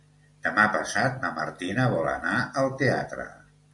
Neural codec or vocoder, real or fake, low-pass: none; real; 10.8 kHz